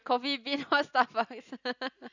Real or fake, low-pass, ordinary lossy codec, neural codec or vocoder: real; 7.2 kHz; none; none